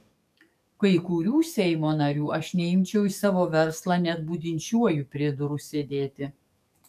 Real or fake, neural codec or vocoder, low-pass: fake; codec, 44.1 kHz, 7.8 kbps, DAC; 14.4 kHz